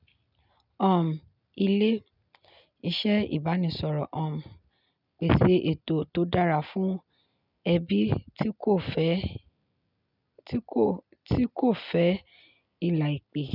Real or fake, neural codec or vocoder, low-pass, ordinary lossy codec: real; none; 5.4 kHz; none